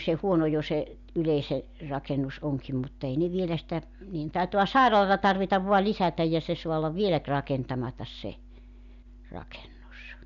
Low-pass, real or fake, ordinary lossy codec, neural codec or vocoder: 7.2 kHz; real; none; none